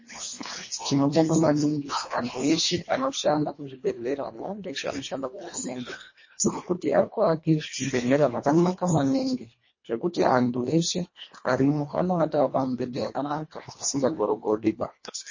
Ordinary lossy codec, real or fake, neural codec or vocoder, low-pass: MP3, 32 kbps; fake; codec, 24 kHz, 1.5 kbps, HILCodec; 7.2 kHz